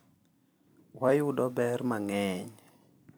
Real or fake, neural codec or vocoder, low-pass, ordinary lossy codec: fake; vocoder, 44.1 kHz, 128 mel bands every 256 samples, BigVGAN v2; none; none